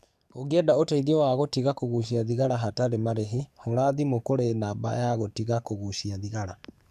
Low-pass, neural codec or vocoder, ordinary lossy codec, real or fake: 14.4 kHz; codec, 44.1 kHz, 7.8 kbps, DAC; AAC, 96 kbps; fake